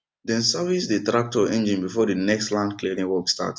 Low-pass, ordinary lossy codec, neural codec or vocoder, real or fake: none; none; none; real